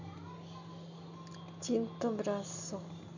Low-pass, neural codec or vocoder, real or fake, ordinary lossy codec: 7.2 kHz; none; real; none